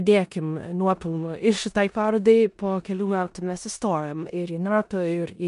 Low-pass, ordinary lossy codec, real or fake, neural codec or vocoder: 10.8 kHz; MP3, 64 kbps; fake; codec, 16 kHz in and 24 kHz out, 0.9 kbps, LongCat-Audio-Codec, four codebook decoder